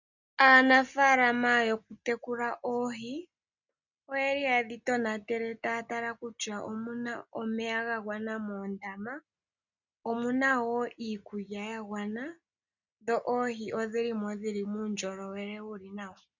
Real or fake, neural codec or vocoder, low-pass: real; none; 7.2 kHz